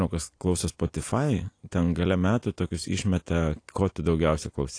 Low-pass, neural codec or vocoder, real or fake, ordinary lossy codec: 9.9 kHz; none; real; AAC, 48 kbps